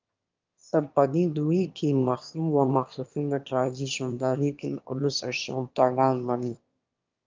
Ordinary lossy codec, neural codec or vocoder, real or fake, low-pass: Opus, 24 kbps; autoencoder, 22.05 kHz, a latent of 192 numbers a frame, VITS, trained on one speaker; fake; 7.2 kHz